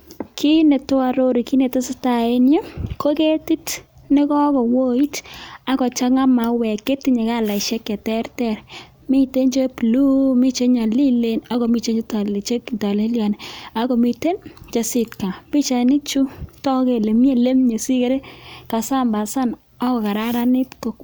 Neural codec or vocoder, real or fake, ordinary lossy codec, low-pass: none; real; none; none